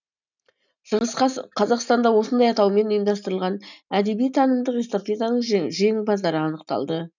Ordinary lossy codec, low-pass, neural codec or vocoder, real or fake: none; 7.2 kHz; none; real